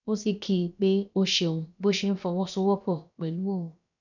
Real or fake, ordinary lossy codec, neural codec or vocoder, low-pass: fake; none; codec, 16 kHz, about 1 kbps, DyCAST, with the encoder's durations; 7.2 kHz